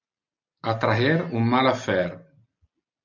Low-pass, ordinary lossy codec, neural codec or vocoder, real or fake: 7.2 kHz; MP3, 64 kbps; none; real